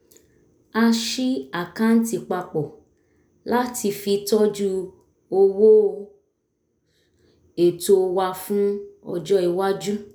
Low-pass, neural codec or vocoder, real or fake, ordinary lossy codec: none; none; real; none